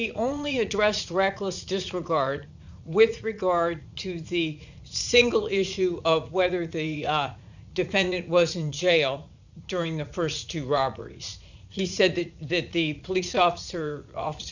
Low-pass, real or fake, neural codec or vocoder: 7.2 kHz; fake; vocoder, 44.1 kHz, 80 mel bands, Vocos